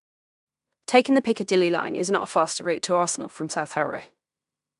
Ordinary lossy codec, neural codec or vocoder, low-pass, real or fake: none; codec, 16 kHz in and 24 kHz out, 0.9 kbps, LongCat-Audio-Codec, fine tuned four codebook decoder; 10.8 kHz; fake